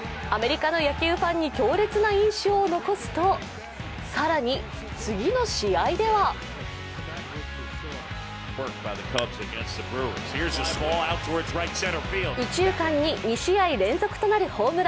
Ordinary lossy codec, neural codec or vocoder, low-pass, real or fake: none; none; none; real